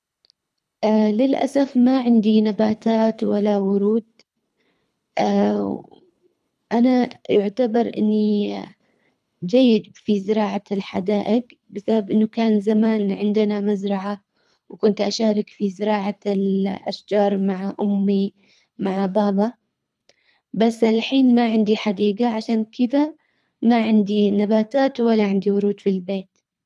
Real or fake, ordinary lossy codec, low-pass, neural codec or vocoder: fake; none; none; codec, 24 kHz, 3 kbps, HILCodec